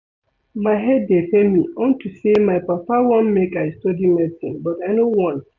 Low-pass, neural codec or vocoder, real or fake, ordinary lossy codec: 7.2 kHz; none; real; none